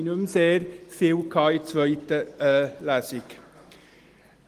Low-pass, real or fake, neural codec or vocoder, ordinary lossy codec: 14.4 kHz; real; none; Opus, 24 kbps